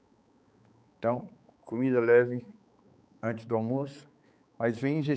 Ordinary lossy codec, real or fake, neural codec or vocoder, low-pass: none; fake; codec, 16 kHz, 4 kbps, X-Codec, HuBERT features, trained on balanced general audio; none